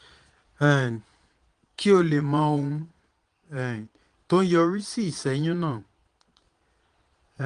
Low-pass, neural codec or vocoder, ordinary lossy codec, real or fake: 9.9 kHz; vocoder, 22.05 kHz, 80 mel bands, Vocos; Opus, 24 kbps; fake